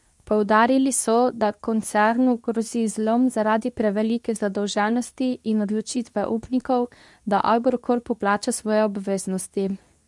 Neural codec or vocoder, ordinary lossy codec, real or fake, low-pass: codec, 24 kHz, 0.9 kbps, WavTokenizer, medium speech release version 2; none; fake; none